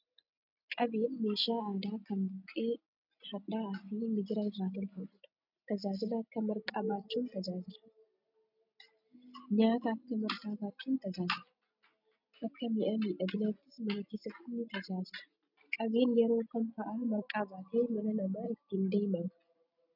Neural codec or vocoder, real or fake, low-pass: none; real; 5.4 kHz